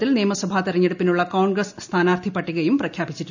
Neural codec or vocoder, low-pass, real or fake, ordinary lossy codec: none; 7.2 kHz; real; none